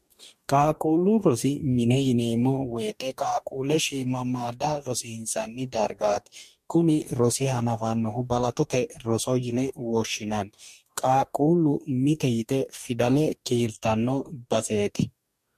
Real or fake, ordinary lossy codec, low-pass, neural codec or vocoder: fake; MP3, 64 kbps; 14.4 kHz; codec, 44.1 kHz, 2.6 kbps, DAC